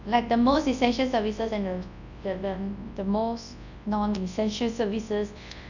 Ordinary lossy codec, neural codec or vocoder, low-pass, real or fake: none; codec, 24 kHz, 0.9 kbps, WavTokenizer, large speech release; 7.2 kHz; fake